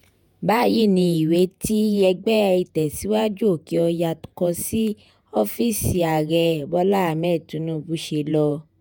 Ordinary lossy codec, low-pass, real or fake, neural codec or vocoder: none; none; fake; vocoder, 48 kHz, 128 mel bands, Vocos